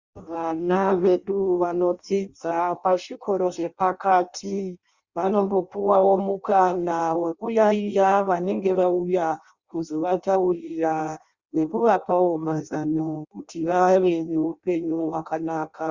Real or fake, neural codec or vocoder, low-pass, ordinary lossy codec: fake; codec, 16 kHz in and 24 kHz out, 0.6 kbps, FireRedTTS-2 codec; 7.2 kHz; Opus, 64 kbps